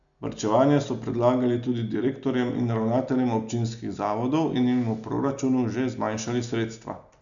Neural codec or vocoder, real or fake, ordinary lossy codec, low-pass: none; real; none; 7.2 kHz